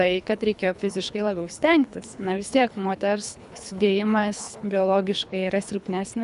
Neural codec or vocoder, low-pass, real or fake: codec, 24 kHz, 3 kbps, HILCodec; 10.8 kHz; fake